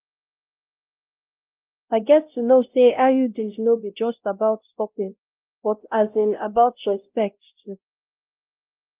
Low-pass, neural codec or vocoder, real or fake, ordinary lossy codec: 3.6 kHz; codec, 16 kHz, 0.5 kbps, X-Codec, WavLM features, trained on Multilingual LibriSpeech; fake; Opus, 32 kbps